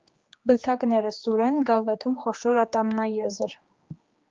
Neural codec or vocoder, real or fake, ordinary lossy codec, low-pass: codec, 16 kHz, 2 kbps, X-Codec, HuBERT features, trained on general audio; fake; Opus, 32 kbps; 7.2 kHz